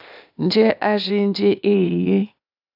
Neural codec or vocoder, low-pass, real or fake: codec, 16 kHz, 0.8 kbps, ZipCodec; 5.4 kHz; fake